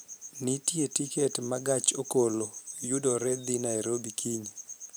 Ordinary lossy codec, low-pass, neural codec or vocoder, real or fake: none; none; vocoder, 44.1 kHz, 128 mel bands every 512 samples, BigVGAN v2; fake